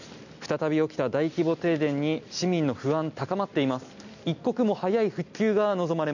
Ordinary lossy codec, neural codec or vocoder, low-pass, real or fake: none; none; 7.2 kHz; real